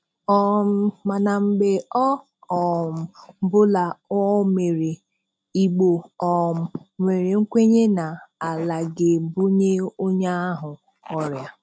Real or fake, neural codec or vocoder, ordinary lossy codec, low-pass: real; none; none; none